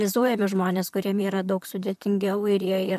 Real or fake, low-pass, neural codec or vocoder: fake; 14.4 kHz; vocoder, 44.1 kHz, 128 mel bands, Pupu-Vocoder